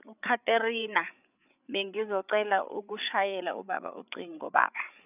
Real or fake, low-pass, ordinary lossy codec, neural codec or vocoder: fake; 3.6 kHz; none; codec, 16 kHz, 8 kbps, FreqCodec, larger model